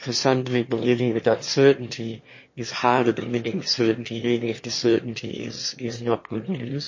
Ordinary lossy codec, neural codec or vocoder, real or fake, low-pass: MP3, 32 kbps; autoencoder, 22.05 kHz, a latent of 192 numbers a frame, VITS, trained on one speaker; fake; 7.2 kHz